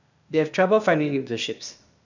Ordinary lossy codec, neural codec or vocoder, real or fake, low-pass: none; codec, 16 kHz, 0.8 kbps, ZipCodec; fake; 7.2 kHz